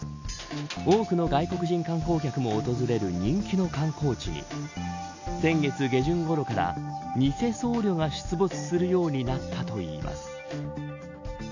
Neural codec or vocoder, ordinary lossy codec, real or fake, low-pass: none; none; real; 7.2 kHz